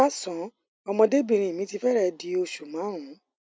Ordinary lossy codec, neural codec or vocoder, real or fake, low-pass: none; none; real; none